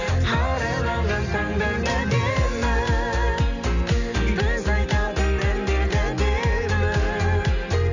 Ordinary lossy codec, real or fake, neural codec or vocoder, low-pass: none; real; none; 7.2 kHz